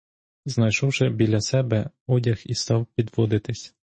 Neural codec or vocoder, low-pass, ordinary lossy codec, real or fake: autoencoder, 48 kHz, 128 numbers a frame, DAC-VAE, trained on Japanese speech; 9.9 kHz; MP3, 32 kbps; fake